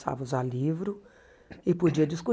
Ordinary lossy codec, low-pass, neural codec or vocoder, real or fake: none; none; none; real